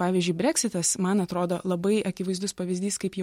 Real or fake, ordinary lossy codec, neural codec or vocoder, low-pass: real; MP3, 64 kbps; none; 19.8 kHz